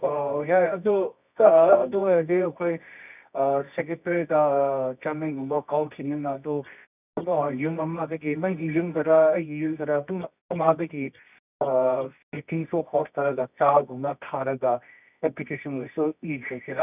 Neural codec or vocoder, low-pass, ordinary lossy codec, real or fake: codec, 24 kHz, 0.9 kbps, WavTokenizer, medium music audio release; 3.6 kHz; none; fake